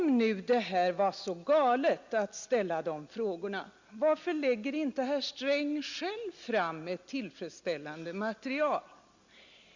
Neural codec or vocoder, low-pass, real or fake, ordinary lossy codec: none; 7.2 kHz; real; Opus, 64 kbps